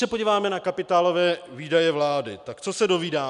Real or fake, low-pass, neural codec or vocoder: real; 10.8 kHz; none